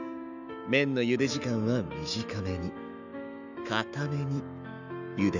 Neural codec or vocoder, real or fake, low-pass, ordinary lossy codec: autoencoder, 48 kHz, 128 numbers a frame, DAC-VAE, trained on Japanese speech; fake; 7.2 kHz; none